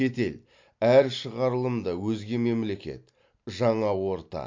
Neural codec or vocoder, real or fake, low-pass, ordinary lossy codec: none; real; 7.2 kHz; MP3, 48 kbps